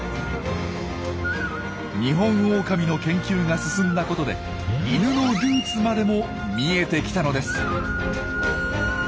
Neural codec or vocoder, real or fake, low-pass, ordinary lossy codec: none; real; none; none